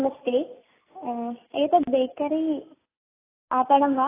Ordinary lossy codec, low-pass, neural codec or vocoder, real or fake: AAC, 16 kbps; 3.6 kHz; none; real